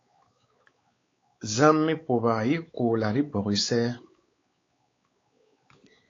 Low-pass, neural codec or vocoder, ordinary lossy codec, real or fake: 7.2 kHz; codec, 16 kHz, 4 kbps, X-Codec, WavLM features, trained on Multilingual LibriSpeech; AAC, 32 kbps; fake